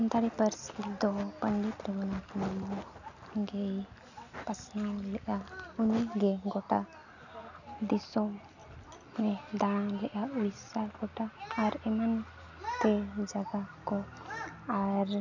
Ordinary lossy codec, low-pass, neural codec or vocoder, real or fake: none; 7.2 kHz; none; real